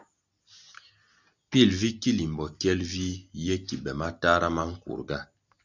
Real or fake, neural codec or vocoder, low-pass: real; none; 7.2 kHz